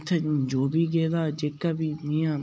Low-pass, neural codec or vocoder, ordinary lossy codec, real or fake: none; none; none; real